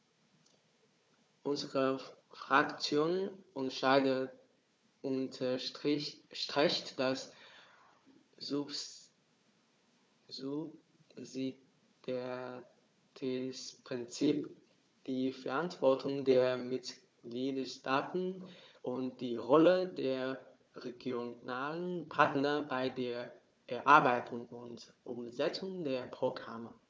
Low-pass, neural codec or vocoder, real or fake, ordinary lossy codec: none; codec, 16 kHz, 4 kbps, FunCodec, trained on Chinese and English, 50 frames a second; fake; none